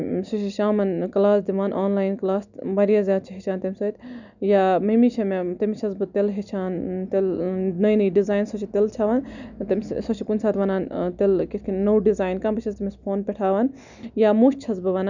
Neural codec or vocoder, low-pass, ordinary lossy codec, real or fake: none; 7.2 kHz; none; real